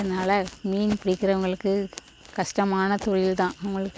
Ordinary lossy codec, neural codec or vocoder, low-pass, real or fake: none; none; none; real